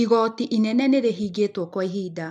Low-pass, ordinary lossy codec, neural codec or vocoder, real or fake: none; none; none; real